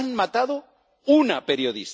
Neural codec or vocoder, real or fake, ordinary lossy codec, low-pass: none; real; none; none